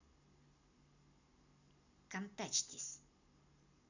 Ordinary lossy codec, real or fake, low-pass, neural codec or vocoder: none; real; 7.2 kHz; none